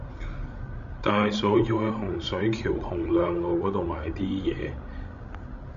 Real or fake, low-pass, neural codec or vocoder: fake; 7.2 kHz; codec, 16 kHz, 16 kbps, FreqCodec, larger model